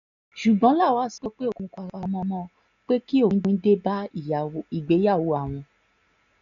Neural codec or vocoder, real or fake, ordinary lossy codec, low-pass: none; real; none; 7.2 kHz